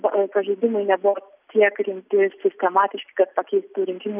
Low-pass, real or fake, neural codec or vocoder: 3.6 kHz; real; none